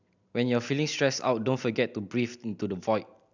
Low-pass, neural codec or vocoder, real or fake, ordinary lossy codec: 7.2 kHz; none; real; none